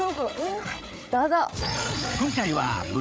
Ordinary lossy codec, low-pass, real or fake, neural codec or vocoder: none; none; fake; codec, 16 kHz, 8 kbps, FreqCodec, larger model